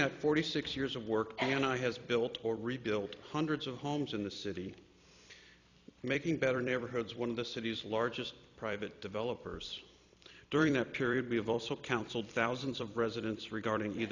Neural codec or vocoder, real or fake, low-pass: vocoder, 44.1 kHz, 128 mel bands every 256 samples, BigVGAN v2; fake; 7.2 kHz